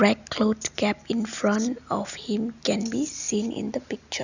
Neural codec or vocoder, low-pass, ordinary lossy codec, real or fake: none; 7.2 kHz; none; real